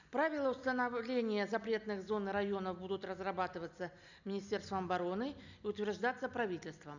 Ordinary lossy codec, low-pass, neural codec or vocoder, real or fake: none; 7.2 kHz; none; real